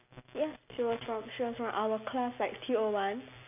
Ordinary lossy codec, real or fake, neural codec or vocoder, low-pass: none; real; none; 3.6 kHz